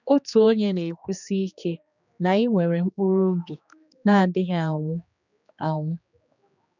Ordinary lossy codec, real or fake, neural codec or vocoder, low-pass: none; fake; codec, 16 kHz, 2 kbps, X-Codec, HuBERT features, trained on general audio; 7.2 kHz